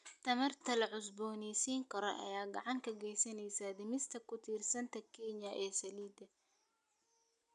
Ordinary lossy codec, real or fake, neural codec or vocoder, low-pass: none; real; none; 10.8 kHz